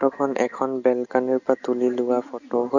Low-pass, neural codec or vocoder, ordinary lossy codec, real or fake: 7.2 kHz; none; none; real